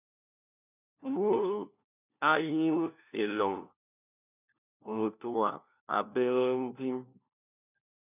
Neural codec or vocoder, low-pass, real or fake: codec, 16 kHz, 1 kbps, FunCodec, trained on LibriTTS, 50 frames a second; 3.6 kHz; fake